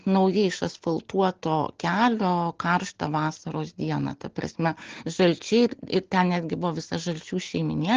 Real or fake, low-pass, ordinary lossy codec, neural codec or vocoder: real; 7.2 kHz; Opus, 16 kbps; none